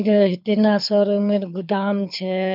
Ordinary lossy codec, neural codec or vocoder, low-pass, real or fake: none; codec, 24 kHz, 6 kbps, HILCodec; 5.4 kHz; fake